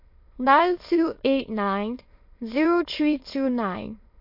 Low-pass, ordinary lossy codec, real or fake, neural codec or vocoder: 5.4 kHz; MP3, 32 kbps; fake; autoencoder, 22.05 kHz, a latent of 192 numbers a frame, VITS, trained on many speakers